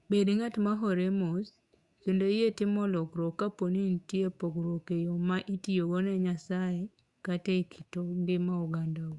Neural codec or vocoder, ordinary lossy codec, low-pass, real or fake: autoencoder, 48 kHz, 128 numbers a frame, DAC-VAE, trained on Japanese speech; Opus, 64 kbps; 10.8 kHz; fake